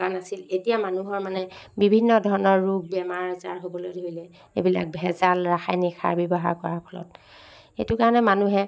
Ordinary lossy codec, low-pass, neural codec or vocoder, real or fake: none; none; none; real